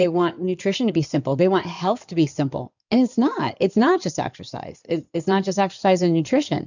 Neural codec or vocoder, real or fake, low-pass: codec, 16 kHz in and 24 kHz out, 2.2 kbps, FireRedTTS-2 codec; fake; 7.2 kHz